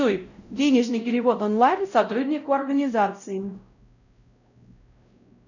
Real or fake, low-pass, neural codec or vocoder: fake; 7.2 kHz; codec, 16 kHz, 0.5 kbps, X-Codec, WavLM features, trained on Multilingual LibriSpeech